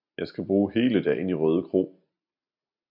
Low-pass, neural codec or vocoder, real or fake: 5.4 kHz; none; real